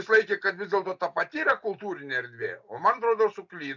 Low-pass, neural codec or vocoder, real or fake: 7.2 kHz; none; real